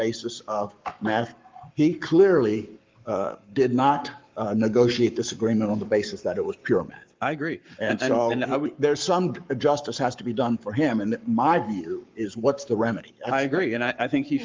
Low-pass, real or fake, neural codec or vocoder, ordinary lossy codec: 7.2 kHz; fake; codec, 44.1 kHz, 7.8 kbps, Pupu-Codec; Opus, 24 kbps